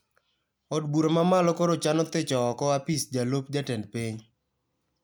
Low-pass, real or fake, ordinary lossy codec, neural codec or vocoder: none; real; none; none